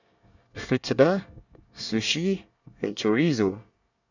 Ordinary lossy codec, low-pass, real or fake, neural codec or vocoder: none; 7.2 kHz; fake; codec, 24 kHz, 1 kbps, SNAC